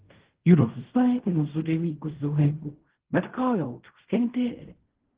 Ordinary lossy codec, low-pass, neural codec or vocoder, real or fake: Opus, 16 kbps; 3.6 kHz; codec, 16 kHz in and 24 kHz out, 0.4 kbps, LongCat-Audio-Codec, fine tuned four codebook decoder; fake